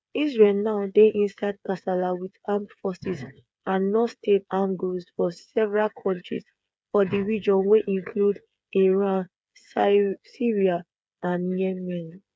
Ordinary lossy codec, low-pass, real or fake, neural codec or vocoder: none; none; fake; codec, 16 kHz, 8 kbps, FreqCodec, smaller model